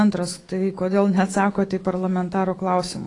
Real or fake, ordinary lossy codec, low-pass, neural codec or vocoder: fake; AAC, 32 kbps; 10.8 kHz; autoencoder, 48 kHz, 128 numbers a frame, DAC-VAE, trained on Japanese speech